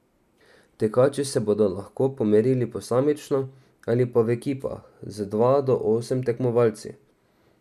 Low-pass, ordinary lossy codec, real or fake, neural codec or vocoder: 14.4 kHz; none; real; none